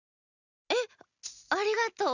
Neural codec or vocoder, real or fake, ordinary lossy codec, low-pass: none; real; none; 7.2 kHz